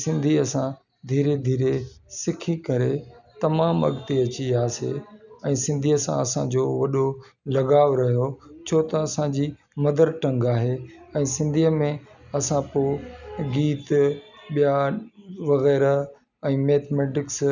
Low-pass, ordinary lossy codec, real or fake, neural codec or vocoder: 7.2 kHz; none; real; none